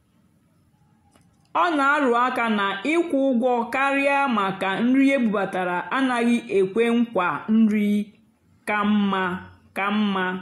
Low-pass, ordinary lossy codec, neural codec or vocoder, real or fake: 19.8 kHz; AAC, 48 kbps; none; real